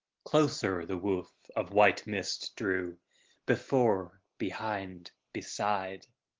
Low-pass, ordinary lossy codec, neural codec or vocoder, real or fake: 7.2 kHz; Opus, 16 kbps; none; real